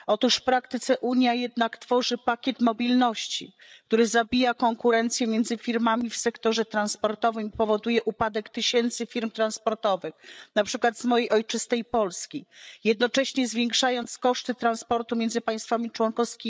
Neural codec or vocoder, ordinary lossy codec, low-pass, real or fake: codec, 16 kHz, 8 kbps, FreqCodec, larger model; none; none; fake